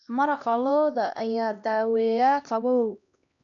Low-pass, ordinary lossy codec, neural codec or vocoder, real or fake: 7.2 kHz; none; codec, 16 kHz, 1 kbps, X-Codec, HuBERT features, trained on LibriSpeech; fake